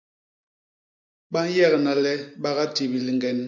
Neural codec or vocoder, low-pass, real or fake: none; 7.2 kHz; real